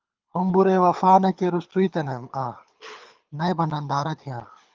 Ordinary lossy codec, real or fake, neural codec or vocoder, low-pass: Opus, 24 kbps; fake; codec, 24 kHz, 6 kbps, HILCodec; 7.2 kHz